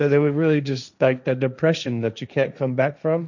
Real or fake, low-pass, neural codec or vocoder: fake; 7.2 kHz; codec, 16 kHz, 1.1 kbps, Voila-Tokenizer